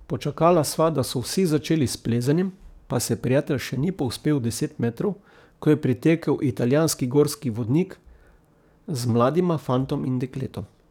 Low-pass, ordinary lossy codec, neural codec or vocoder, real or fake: 19.8 kHz; none; codec, 44.1 kHz, 7.8 kbps, DAC; fake